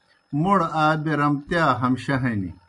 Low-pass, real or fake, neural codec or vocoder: 10.8 kHz; real; none